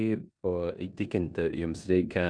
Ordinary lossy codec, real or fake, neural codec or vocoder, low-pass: MP3, 96 kbps; fake; codec, 16 kHz in and 24 kHz out, 0.9 kbps, LongCat-Audio-Codec, four codebook decoder; 9.9 kHz